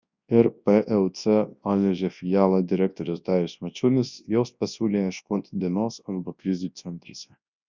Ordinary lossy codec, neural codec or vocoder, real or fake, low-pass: Opus, 64 kbps; codec, 24 kHz, 0.9 kbps, WavTokenizer, large speech release; fake; 7.2 kHz